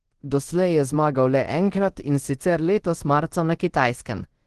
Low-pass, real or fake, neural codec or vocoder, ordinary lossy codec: 10.8 kHz; fake; codec, 24 kHz, 0.5 kbps, DualCodec; Opus, 16 kbps